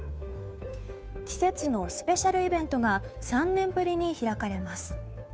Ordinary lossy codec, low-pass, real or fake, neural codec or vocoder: none; none; fake; codec, 16 kHz, 2 kbps, FunCodec, trained on Chinese and English, 25 frames a second